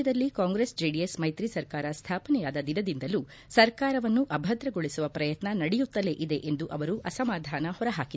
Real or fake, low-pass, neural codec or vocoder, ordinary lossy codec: real; none; none; none